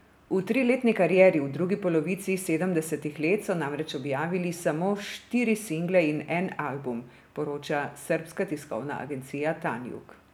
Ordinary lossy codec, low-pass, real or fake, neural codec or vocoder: none; none; real; none